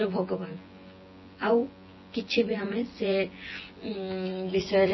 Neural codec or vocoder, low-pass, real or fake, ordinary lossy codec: vocoder, 24 kHz, 100 mel bands, Vocos; 7.2 kHz; fake; MP3, 24 kbps